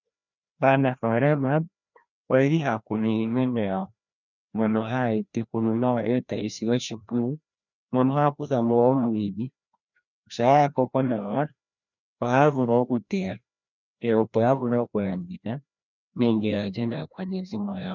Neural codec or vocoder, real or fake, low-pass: codec, 16 kHz, 1 kbps, FreqCodec, larger model; fake; 7.2 kHz